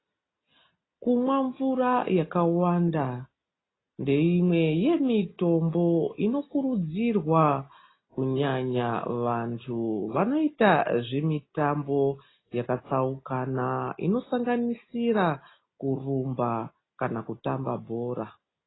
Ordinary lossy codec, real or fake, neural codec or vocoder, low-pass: AAC, 16 kbps; real; none; 7.2 kHz